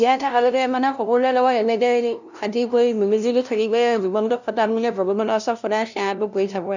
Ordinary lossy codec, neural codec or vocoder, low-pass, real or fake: none; codec, 16 kHz, 0.5 kbps, FunCodec, trained on LibriTTS, 25 frames a second; 7.2 kHz; fake